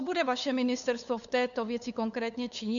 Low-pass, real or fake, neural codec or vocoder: 7.2 kHz; fake; codec, 16 kHz, 8 kbps, FunCodec, trained on LibriTTS, 25 frames a second